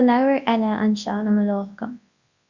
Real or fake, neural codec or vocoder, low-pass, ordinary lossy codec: fake; codec, 24 kHz, 0.9 kbps, WavTokenizer, large speech release; 7.2 kHz; Opus, 64 kbps